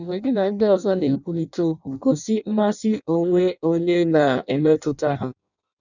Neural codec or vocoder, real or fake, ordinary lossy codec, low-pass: codec, 16 kHz in and 24 kHz out, 0.6 kbps, FireRedTTS-2 codec; fake; none; 7.2 kHz